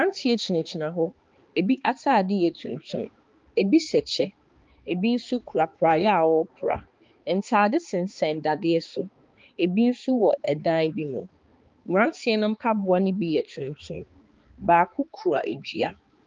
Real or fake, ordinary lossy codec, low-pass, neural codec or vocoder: fake; Opus, 24 kbps; 7.2 kHz; codec, 16 kHz, 2 kbps, X-Codec, HuBERT features, trained on balanced general audio